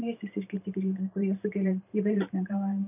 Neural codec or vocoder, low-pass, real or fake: vocoder, 44.1 kHz, 128 mel bands every 512 samples, BigVGAN v2; 3.6 kHz; fake